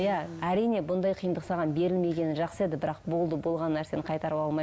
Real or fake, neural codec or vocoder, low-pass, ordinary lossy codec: real; none; none; none